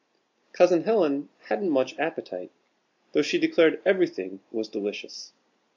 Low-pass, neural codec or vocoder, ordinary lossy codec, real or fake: 7.2 kHz; none; MP3, 64 kbps; real